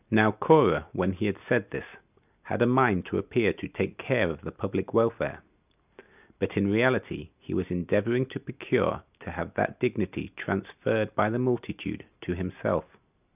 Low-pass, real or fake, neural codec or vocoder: 3.6 kHz; real; none